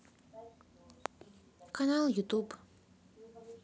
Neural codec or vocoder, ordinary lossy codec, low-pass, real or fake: none; none; none; real